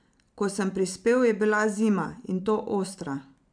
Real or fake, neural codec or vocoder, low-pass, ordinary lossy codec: fake; vocoder, 44.1 kHz, 128 mel bands every 512 samples, BigVGAN v2; 9.9 kHz; MP3, 96 kbps